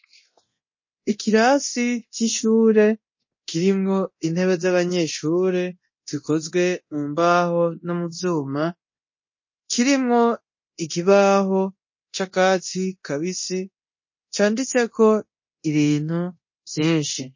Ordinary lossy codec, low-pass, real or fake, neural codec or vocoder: MP3, 32 kbps; 7.2 kHz; fake; codec, 24 kHz, 0.9 kbps, DualCodec